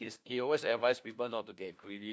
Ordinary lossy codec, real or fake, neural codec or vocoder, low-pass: none; fake; codec, 16 kHz, 1 kbps, FunCodec, trained on LibriTTS, 50 frames a second; none